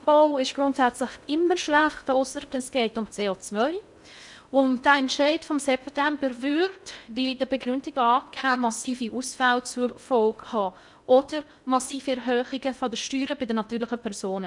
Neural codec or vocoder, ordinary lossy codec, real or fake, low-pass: codec, 16 kHz in and 24 kHz out, 0.6 kbps, FocalCodec, streaming, 2048 codes; none; fake; 10.8 kHz